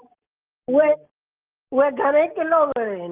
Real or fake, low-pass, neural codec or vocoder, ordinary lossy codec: real; 3.6 kHz; none; Opus, 24 kbps